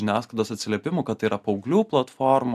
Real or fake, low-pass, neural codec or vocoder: real; 14.4 kHz; none